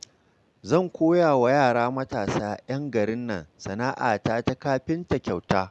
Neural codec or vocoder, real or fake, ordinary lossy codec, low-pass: none; real; none; none